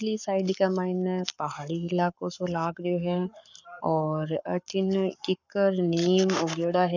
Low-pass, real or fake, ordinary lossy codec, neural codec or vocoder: 7.2 kHz; fake; none; codec, 44.1 kHz, 7.8 kbps, Pupu-Codec